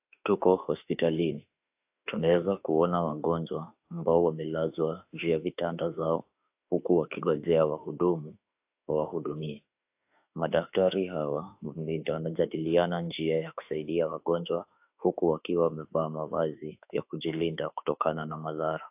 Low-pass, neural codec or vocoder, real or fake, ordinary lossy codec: 3.6 kHz; autoencoder, 48 kHz, 32 numbers a frame, DAC-VAE, trained on Japanese speech; fake; AAC, 32 kbps